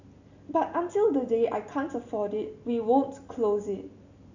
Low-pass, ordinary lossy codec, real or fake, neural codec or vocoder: 7.2 kHz; none; real; none